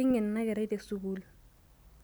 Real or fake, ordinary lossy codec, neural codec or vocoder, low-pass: real; none; none; none